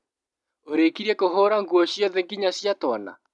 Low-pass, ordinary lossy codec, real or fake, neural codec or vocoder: 10.8 kHz; none; real; none